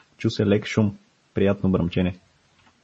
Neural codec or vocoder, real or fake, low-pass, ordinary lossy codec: none; real; 10.8 kHz; MP3, 32 kbps